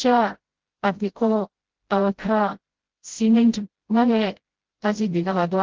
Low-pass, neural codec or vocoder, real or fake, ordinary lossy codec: 7.2 kHz; codec, 16 kHz, 0.5 kbps, FreqCodec, smaller model; fake; Opus, 16 kbps